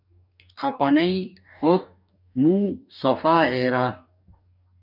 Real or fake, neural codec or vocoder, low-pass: fake; codec, 44.1 kHz, 2.6 kbps, DAC; 5.4 kHz